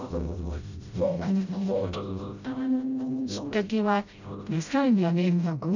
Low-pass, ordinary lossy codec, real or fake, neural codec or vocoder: 7.2 kHz; none; fake; codec, 16 kHz, 0.5 kbps, FreqCodec, smaller model